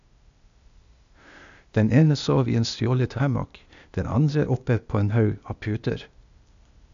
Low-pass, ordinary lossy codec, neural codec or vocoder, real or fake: 7.2 kHz; AAC, 96 kbps; codec, 16 kHz, 0.8 kbps, ZipCodec; fake